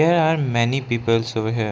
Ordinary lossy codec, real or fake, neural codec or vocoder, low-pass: none; real; none; none